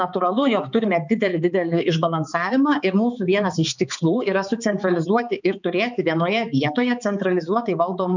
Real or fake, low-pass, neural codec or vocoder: fake; 7.2 kHz; codec, 16 kHz, 4 kbps, X-Codec, HuBERT features, trained on balanced general audio